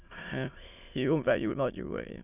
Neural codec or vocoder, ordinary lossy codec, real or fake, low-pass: autoencoder, 22.05 kHz, a latent of 192 numbers a frame, VITS, trained on many speakers; none; fake; 3.6 kHz